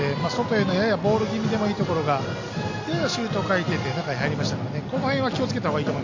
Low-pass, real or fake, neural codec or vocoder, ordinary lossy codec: 7.2 kHz; real; none; AAC, 48 kbps